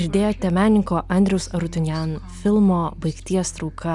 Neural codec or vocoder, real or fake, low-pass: none; real; 10.8 kHz